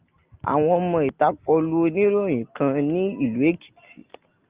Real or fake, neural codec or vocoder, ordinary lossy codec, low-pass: real; none; Opus, 24 kbps; 3.6 kHz